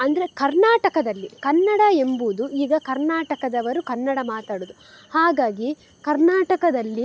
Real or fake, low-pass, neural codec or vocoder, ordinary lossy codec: real; none; none; none